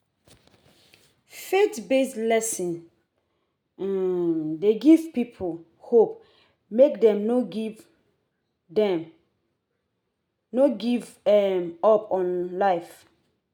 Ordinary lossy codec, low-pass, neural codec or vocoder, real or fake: none; none; none; real